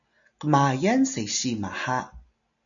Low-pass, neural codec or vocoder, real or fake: 7.2 kHz; none; real